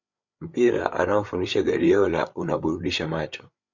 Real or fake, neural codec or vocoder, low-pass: fake; codec, 16 kHz, 8 kbps, FreqCodec, larger model; 7.2 kHz